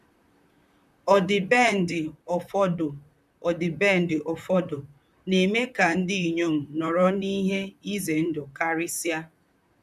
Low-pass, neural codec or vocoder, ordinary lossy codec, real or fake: 14.4 kHz; vocoder, 44.1 kHz, 128 mel bands, Pupu-Vocoder; none; fake